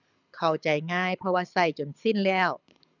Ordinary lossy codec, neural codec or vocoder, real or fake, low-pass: none; codec, 24 kHz, 6 kbps, HILCodec; fake; 7.2 kHz